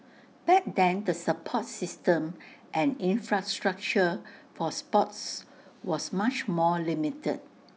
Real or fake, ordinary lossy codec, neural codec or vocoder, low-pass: real; none; none; none